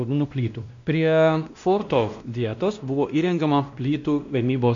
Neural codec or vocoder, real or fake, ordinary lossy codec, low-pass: codec, 16 kHz, 1 kbps, X-Codec, WavLM features, trained on Multilingual LibriSpeech; fake; MP3, 48 kbps; 7.2 kHz